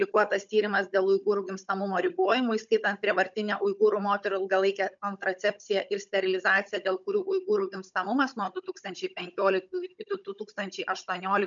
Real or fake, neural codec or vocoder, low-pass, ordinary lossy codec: fake; codec, 16 kHz, 16 kbps, FunCodec, trained on Chinese and English, 50 frames a second; 7.2 kHz; MP3, 96 kbps